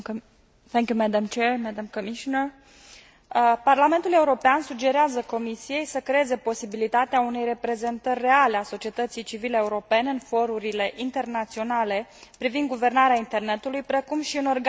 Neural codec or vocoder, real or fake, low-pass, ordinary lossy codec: none; real; none; none